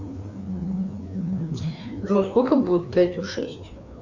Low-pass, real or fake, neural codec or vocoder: 7.2 kHz; fake; codec, 16 kHz, 2 kbps, FreqCodec, larger model